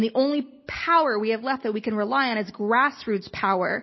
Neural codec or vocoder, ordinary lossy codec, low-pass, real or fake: none; MP3, 24 kbps; 7.2 kHz; real